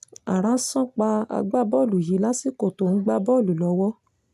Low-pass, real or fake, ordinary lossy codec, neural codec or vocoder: 14.4 kHz; real; none; none